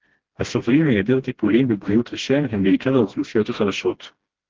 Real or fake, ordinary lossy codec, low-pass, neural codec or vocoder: fake; Opus, 16 kbps; 7.2 kHz; codec, 16 kHz, 1 kbps, FreqCodec, smaller model